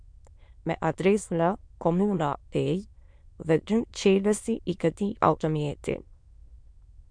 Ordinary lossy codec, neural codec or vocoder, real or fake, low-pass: MP3, 64 kbps; autoencoder, 22.05 kHz, a latent of 192 numbers a frame, VITS, trained on many speakers; fake; 9.9 kHz